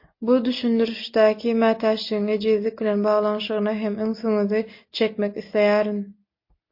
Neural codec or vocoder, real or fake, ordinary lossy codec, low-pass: none; real; MP3, 32 kbps; 5.4 kHz